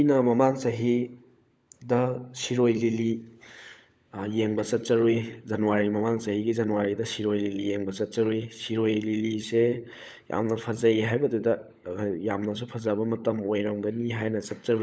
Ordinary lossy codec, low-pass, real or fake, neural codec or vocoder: none; none; fake; codec, 16 kHz, 16 kbps, FunCodec, trained on LibriTTS, 50 frames a second